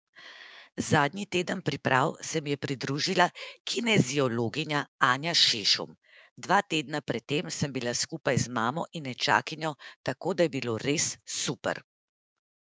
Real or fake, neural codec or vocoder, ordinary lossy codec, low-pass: fake; codec, 16 kHz, 6 kbps, DAC; none; none